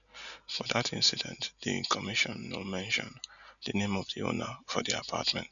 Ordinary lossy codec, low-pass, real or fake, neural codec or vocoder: none; 7.2 kHz; real; none